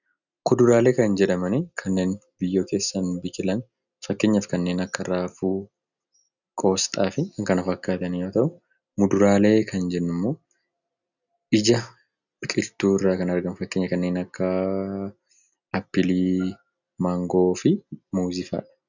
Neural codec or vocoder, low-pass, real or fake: none; 7.2 kHz; real